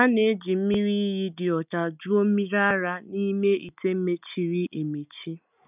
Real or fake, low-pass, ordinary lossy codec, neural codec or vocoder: real; 3.6 kHz; none; none